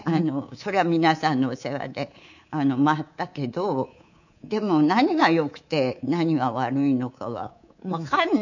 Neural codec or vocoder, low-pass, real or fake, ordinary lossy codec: codec, 24 kHz, 3.1 kbps, DualCodec; 7.2 kHz; fake; none